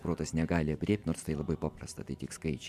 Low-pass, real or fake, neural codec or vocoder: 14.4 kHz; fake; vocoder, 44.1 kHz, 128 mel bands every 512 samples, BigVGAN v2